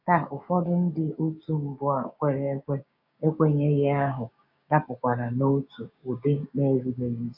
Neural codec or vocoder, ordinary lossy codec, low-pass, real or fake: codec, 16 kHz, 6 kbps, DAC; none; 5.4 kHz; fake